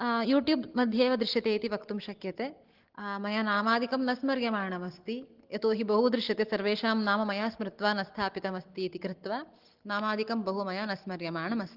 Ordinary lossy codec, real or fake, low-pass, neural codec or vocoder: Opus, 16 kbps; real; 5.4 kHz; none